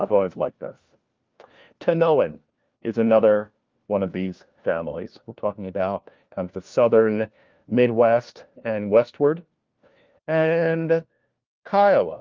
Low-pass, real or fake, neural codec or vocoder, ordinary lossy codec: 7.2 kHz; fake; codec, 16 kHz, 1 kbps, FunCodec, trained on LibriTTS, 50 frames a second; Opus, 32 kbps